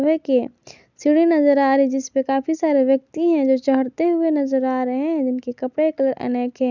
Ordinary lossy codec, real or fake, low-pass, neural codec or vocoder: none; real; 7.2 kHz; none